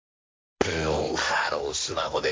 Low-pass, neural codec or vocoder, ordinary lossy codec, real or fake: none; codec, 16 kHz, 1.1 kbps, Voila-Tokenizer; none; fake